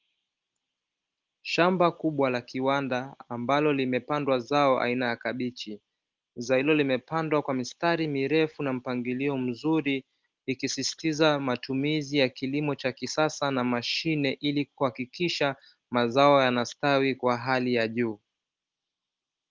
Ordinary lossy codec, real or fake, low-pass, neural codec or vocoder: Opus, 32 kbps; real; 7.2 kHz; none